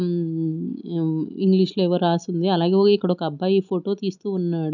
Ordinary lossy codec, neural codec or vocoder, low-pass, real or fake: none; none; 7.2 kHz; real